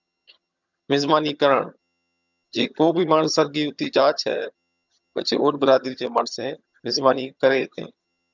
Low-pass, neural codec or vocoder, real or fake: 7.2 kHz; vocoder, 22.05 kHz, 80 mel bands, HiFi-GAN; fake